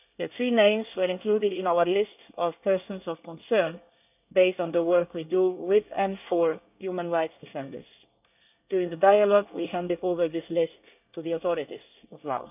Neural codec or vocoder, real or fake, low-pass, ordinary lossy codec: codec, 24 kHz, 1 kbps, SNAC; fake; 3.6 kHz; none